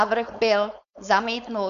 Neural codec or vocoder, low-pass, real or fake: codec, 16 kHz, 4.8 kbps, FACodec; 7.2 kHz; fake